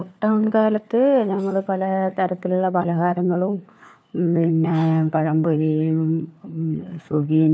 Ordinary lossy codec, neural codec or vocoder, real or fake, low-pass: none; codec, 16 kHz, 4 kbps, FunCodec, trained on LibriTTS, 50 frames a second; fake; none